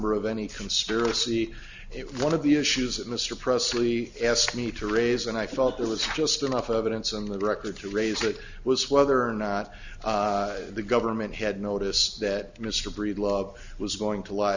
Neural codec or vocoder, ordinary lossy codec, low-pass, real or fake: none; Opus, 64 kbps; 7.2 kHz; real